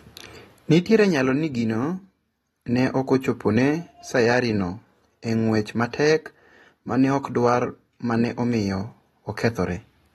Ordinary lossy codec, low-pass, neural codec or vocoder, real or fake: AAC, 32 kbps; 14.4 kHz; none; real